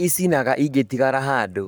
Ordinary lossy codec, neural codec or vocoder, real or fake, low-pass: none; codec, 44.1 kHz, 7.8 kbps, Pupu-Codec; fake; none